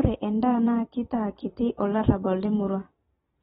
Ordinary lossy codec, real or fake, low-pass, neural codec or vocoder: AAC, 16 kbps; fake; 19.8 kHz; vocoder, 44.1 kHz, 128 mel bands every 256 samples, BigVGAN v2